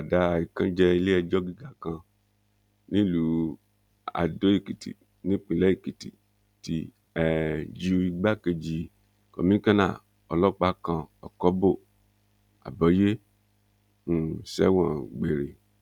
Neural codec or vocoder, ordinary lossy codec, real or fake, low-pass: none; none; real; 19.8 kHz